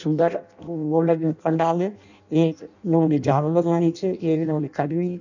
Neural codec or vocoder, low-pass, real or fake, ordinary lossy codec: codec, 16 kHz in and 24 kHz out, 0.6 kbps, FireRedTTS-2 codec; 7.2 kHz; fake; none